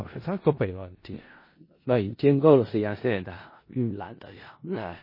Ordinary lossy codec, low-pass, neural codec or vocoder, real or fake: MP3, 24 kbps; 5.4 kHz; codec, 16 kHz in and 24 kHz out, 0.4 kbps, LongCat-Audio-Codec, four codebook decoder; fake